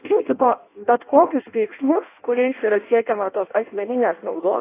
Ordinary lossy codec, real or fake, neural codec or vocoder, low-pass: AAC, 24 kbps; fake; codec, 16 kHz in and 24 kHz out, 0.6 kbps, FireRedTTS-2 codec; 3.6 kHz